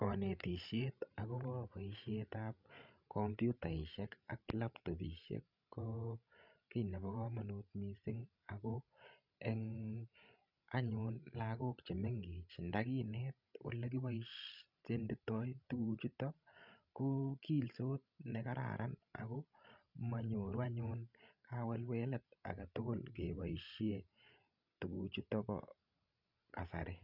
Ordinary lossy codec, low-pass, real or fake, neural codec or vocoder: none; 5.4 kHz; fake; codec, 16 kHz, 16 kbps, FreqCodec, larger model